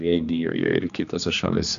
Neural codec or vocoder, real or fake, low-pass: codec, 16 kHz, 2 kbps, X-Codec, HuBERT features, trained on general audio; fake; 7.2 kHz